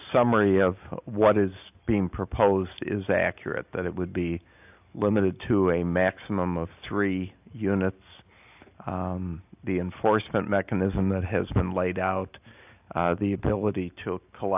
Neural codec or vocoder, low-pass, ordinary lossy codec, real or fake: none; 3.6 kHz; AAC, 32 kbps; real